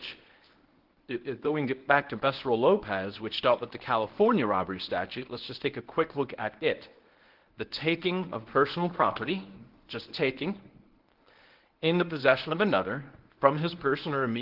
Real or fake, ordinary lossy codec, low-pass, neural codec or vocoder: fake; Opus, 16 kbps; 5.4 kHz; codec, 24 kHz, 0.9 kbps, WavTokenizer, medium speech release version 1